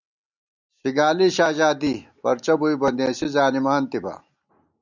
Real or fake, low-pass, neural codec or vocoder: real; 7.2 kHz; none